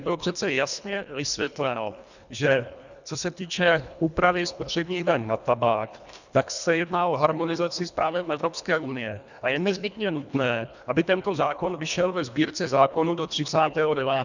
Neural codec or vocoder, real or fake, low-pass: codec, 24 kHz, 1.5 kbps, HILCodec; fake; 7.2 kHz